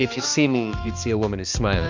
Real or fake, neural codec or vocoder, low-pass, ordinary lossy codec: fake; codec, 16 kHz, 2 kbps, X-Codec, HuBERT features, trained on balanced general audio; 7.2 kHz; MP3, 64 kbps